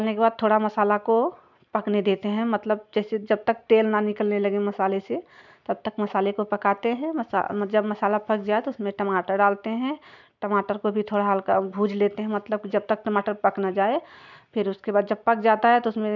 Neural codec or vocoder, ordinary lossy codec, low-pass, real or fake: none; none; 7.2 kHz; real